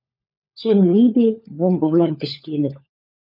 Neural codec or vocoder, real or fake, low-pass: codec, 16 kHz, 4 kbps, FunCodec, trained on LibriTTS, 50 frames a second; fake; 5.4 kHz